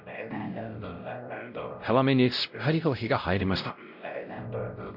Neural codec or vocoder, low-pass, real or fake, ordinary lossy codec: codec, 16 kHz, 0.5 kbps, X-Codec, WavLM features, trained on Multilingual LibriSpeech; 5.4 kHz; fake; none